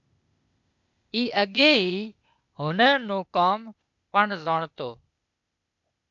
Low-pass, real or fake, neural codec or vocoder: 7.2 kHz; fake; codec, 16 kHz, 0.8 kbps, ZipCodec